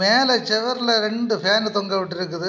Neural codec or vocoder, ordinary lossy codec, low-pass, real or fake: none; none; none; real